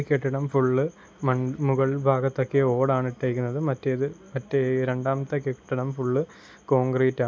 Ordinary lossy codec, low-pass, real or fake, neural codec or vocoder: none; none; real; none